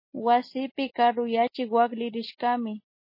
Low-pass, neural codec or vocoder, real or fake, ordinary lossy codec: 5.4 kHz; none; real; MP3, 24 kbps